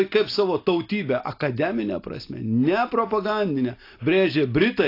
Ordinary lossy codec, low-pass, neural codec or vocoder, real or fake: AAC, 32 kbps; 5.4 kHz; none; real